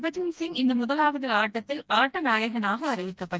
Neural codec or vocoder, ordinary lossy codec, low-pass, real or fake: codec, 16 kHz, 1 kbps, FreqCodec, smaller model; none; none; fake